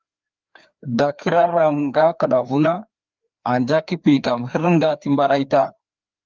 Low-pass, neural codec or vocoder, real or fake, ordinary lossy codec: 7.2 kHz; codec, 16 kHz, 2 kbps, FreqCodec, larger model; fake; Opus, 32 kbps